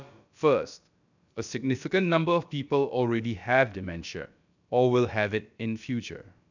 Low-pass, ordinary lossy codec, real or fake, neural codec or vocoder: 7.2 kHz; none; fake; codec, 16 kHz, about 1 kbps, DyCAST, with the encoder's durations